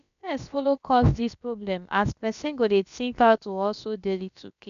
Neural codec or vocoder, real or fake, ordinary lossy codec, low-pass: codec, 16 kHz, about 1 kbps, DyCAST, with the encoder's durations; fake; none; 7.2 kHz